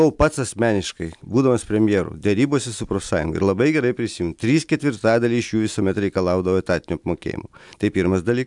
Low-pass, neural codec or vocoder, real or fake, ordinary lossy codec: 10.8 kHz; none; real; MP3, 96 kbps